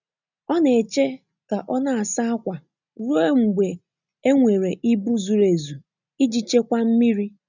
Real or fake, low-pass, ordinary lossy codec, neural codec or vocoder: real; 7.2 kHz; none; none